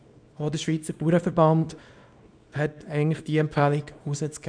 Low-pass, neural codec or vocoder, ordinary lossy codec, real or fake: 9.9 kHz; codec, 24 kHz, 0.9 kbps, WavTokenizer, small release; none; fake